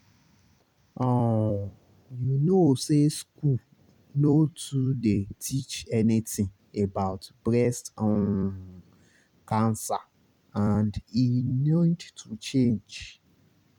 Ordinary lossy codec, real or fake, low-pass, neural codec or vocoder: none; fake; 19.8 kHz; vocoder, 44.1 kHz, 128 mel bands every 256 samples, BigVGAN v2